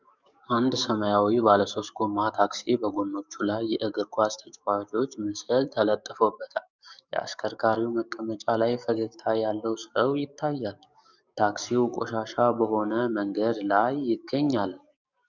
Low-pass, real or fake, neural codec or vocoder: 7.2 kHz; fake; codec, 44.1 kHz, 7.8 kbps, DAC